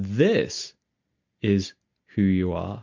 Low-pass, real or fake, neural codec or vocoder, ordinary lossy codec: 7.2 kHz; real; none; MP3, 48 kbps